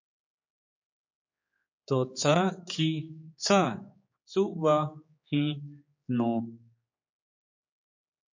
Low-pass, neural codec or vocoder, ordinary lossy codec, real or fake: 7.2 kHz; codec, 16 kHz, 4 kbps, X-Codec, HuBERT features, trained on general audio; MP3, 48 kbps; fake